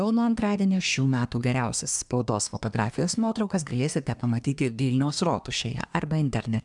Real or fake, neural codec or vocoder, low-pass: fake; codec, 24 kHz, 1 kbps, SNAC; 10.8 kHz